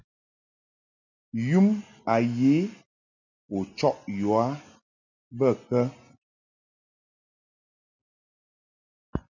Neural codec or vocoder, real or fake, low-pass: none; real; 7.2 kHz